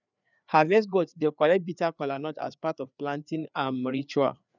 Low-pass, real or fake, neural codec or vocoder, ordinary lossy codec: 7.2 kHz; fake; codec, 16 kHz, 4 kbps, FreqCodec, larger model; none